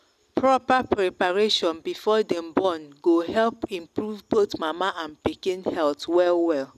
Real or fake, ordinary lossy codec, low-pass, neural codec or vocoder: real; none; 14.4 kHz; none